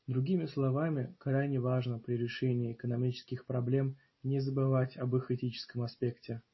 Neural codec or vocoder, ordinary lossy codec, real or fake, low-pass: none; MP3, 24 kbps; real; 7.2 kHz